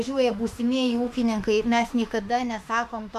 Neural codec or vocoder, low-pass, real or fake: autoencoder, 48 kHz, 32 numbers a frame, DAC-VAE, trained on Japanese speech; 14.4 kHz; fake